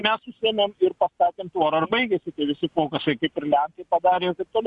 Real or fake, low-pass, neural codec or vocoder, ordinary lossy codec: real; 10.8 kHz; none; Opus, 64 kbps